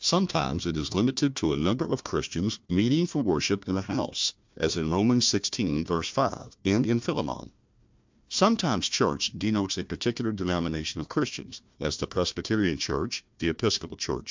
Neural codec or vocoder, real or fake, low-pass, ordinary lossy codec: codec, 16 kHz, 1 kbps, FunCodec, trained on Chinese and English, 50 frames a second; fake; 7.2 kHz; MP3, 64 kbps